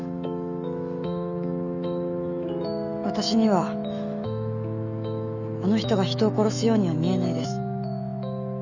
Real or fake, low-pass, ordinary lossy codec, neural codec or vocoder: fake; 7.2 kHz; none; autoencoder, 48 kHz, 128 numbers a frame, DAC-VAE, trained on Japanese speech